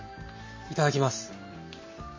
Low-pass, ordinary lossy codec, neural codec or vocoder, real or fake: 7.2 kHz; MP3, 32 kbps; none; real